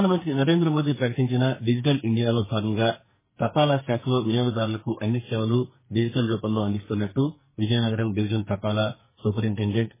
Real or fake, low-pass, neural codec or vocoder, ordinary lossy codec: fake; 3.6 kHz; codec, 44.1 kHz, 2.6 kbps, SNAC; MP3, 16 kbps